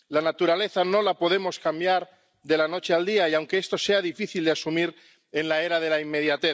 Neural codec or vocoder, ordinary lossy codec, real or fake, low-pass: none; none; real; none